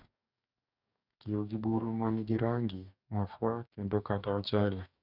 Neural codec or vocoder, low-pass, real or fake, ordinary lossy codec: codec, 44.1 kHz, 2.6 kbps, DAC; 5.4 kHz; fake; none